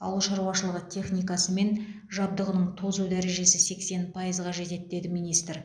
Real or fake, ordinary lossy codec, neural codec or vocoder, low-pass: real; none; none; 9.9 kHz